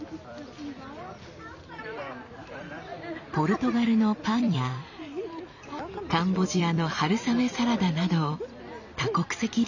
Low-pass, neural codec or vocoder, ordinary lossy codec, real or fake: 7.2 kHz; vocoder, 44.1 kHz, 128 mel bands every 256 samples, BigVGAN v2; none; fake